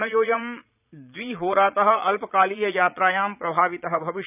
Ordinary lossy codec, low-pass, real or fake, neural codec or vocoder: none; 3.6 kHz; fake; vocoder, 22.05 kHz, 80 mel bands, Vocos